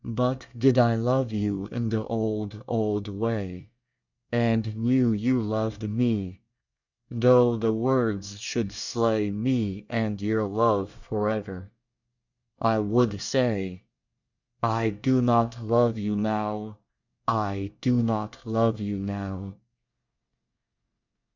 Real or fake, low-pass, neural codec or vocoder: fake; 7.2 kHz; codec, 24 kHz, 1 kbps, SNAC